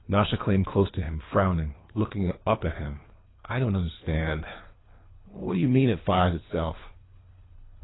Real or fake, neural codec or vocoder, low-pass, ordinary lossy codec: fake; codec, 24 kHz, 3 kbps, HILCodec; 7.2 kHz; AAC, 16 kbps